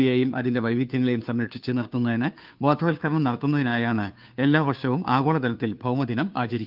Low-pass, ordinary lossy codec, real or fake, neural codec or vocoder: 5.4 kHz; Opus, 24 kbps; fake; codec, 16 kHz, 2 kbps, FunCodec, trained on Chinese and English, 25 frames a second